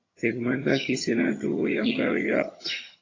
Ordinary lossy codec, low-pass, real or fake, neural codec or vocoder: AAC, 32 kbps; 7.2 kHz; fake; vocoder, 22.05 kHz, 80 mel bands, HiFi-GAN